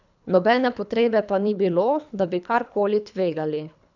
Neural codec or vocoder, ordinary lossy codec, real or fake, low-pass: codec, 24 kHz, 3 kbps, HILCodec; none; fake; 7.2 kHz